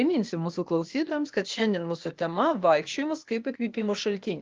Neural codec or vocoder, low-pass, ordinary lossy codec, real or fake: codec, 16 kHz, 0.8 kbps, ZipCodec; 7.2 kHz; Opus, 32 kbps; fake